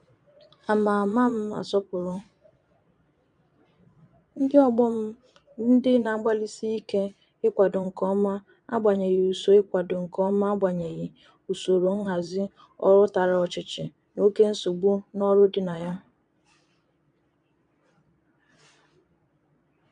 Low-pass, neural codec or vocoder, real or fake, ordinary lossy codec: 9.9 kHz; vocoder, 22.05 kHz, 80 mel bands, Vocos; fake; none